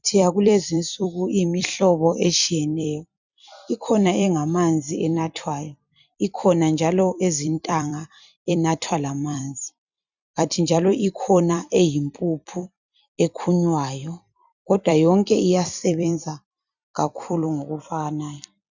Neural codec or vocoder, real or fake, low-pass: vocoder, 44.1 kHz, 128 mel bands every 256 samples, BigVGAN v2; fake; 7.2 kHz